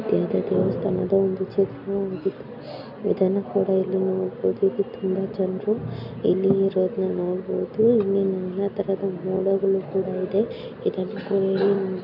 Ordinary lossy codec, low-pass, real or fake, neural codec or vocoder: none; 5.4 kHz; real; none